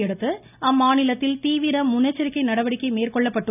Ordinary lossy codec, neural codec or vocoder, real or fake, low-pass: none; none; real; 3.6 kHz